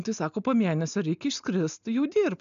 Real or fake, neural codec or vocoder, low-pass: real; none; 7.2 kHz